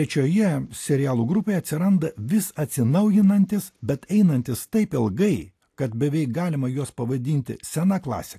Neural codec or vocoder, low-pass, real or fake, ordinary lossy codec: none; 14.4 kHz; real; AAC, 64 kbps